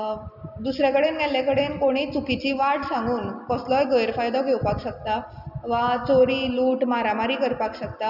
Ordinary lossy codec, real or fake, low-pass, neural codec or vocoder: none; real; 5.4 kHz; none